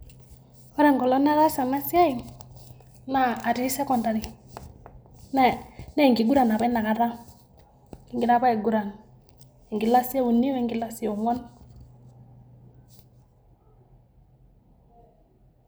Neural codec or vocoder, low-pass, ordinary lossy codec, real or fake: none; none; none; real